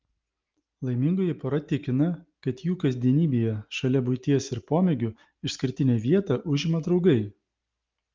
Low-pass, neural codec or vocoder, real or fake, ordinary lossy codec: 7.2 kHz; none; real; Opus, 32 kbps